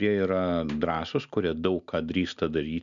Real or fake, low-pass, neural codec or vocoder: real; 7.2 kHz; none